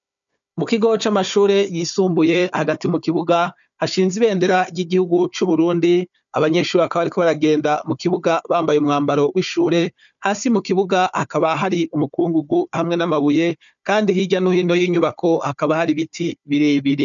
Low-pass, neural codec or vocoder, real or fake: 7.2 kHz; codec, 16 kHz, 4 kbps, FunCodec, trained on Chinese and English, 50 frames a second; fake